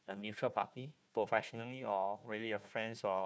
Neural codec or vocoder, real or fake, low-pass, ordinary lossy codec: codec, 16 kHz, 1 kbps, FunCodec, trained on Chinese and English, 50 frames a second; fake; none; none